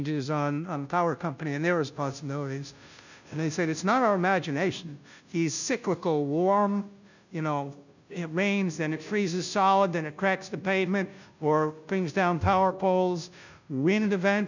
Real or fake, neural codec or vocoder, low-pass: fake; codec, 16 kHz, 0.5 kbps, FunCodec, trained on Chinese and English, 25 frames a second; 7.2 kHz